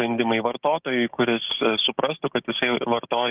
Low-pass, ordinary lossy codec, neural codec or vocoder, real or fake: 3.6 kHz; Opus, 24 kbps; codec, 16 kHz, 6 kbps, DAC; fake